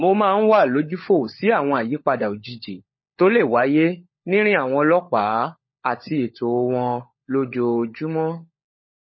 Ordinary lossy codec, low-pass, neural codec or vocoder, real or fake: MP3, 24 kbps; 7.2 kHz; codec, 16 kHz, 16 kbps, FunCodec, trained on LibriTTS, 50 frames a second; fake